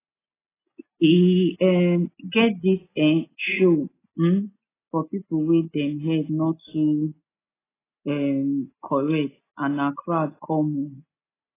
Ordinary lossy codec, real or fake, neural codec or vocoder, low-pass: AAC, 16 kbps; real; none; 3.6 kHz